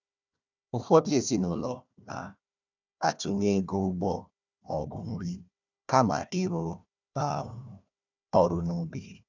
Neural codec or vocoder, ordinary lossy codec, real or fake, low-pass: codec, 16 kHz, 1 kbps, FunCodec, trained on Chinese and English, 50 frames a second; none; fake; 7.2 kHz